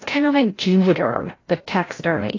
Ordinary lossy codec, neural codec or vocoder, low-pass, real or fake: AAC, 32 kbps; codec, 16 kHz, 0.5 kbps, FreqCodec, larger model; 7.2 kHz; fake